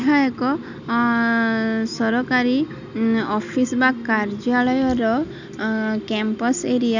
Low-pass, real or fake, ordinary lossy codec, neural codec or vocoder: 7.2 kHz; real; none; none